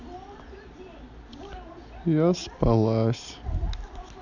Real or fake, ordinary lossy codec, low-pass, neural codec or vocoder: real; none; 7.2 kHz; none